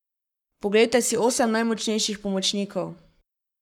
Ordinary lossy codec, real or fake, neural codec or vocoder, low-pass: none; fake; codec, 44.1 kHz, 7.8 kbps, Pupu-Codec; 19.8 kHz